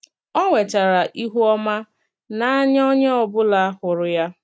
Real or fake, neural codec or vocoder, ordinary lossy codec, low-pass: real; none; none; none